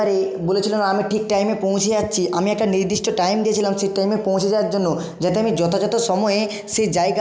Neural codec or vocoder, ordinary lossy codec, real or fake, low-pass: none; none; real; none